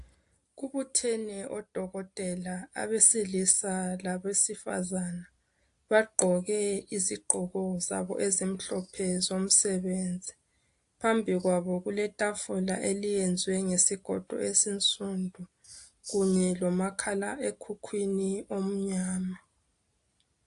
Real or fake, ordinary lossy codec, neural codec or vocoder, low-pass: real; AAC, 64 kbps; none; 10.8 kHz